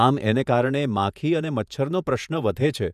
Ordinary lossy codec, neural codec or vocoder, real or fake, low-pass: none; vocoder, 48 kHz, 128 mel bands, Vocos; fake; 14.4 kHz